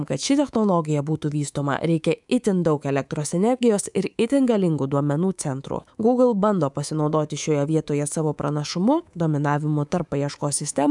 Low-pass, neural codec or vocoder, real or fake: 10.8 kHz; codec, 24 kHz, 3.1 kbps, DualCodec; fake